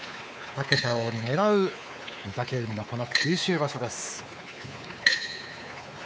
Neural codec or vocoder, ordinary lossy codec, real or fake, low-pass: codec, 16 kHz, 4 kbps, X-Codec, WavLM features, trained on Multilingual LibriSpeech; none; fake; none